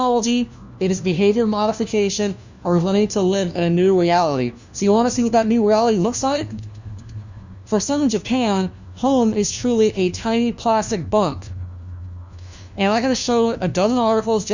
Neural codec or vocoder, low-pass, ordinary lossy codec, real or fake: codec, 16 kHz, 1 kbps, FunCodec, trained on LibriTTS, 50 frames a second; 7.2 kHz; Opus, 64 kbps; fake